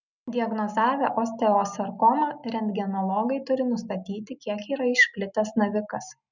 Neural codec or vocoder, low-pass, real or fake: none; 7.2 kHz; real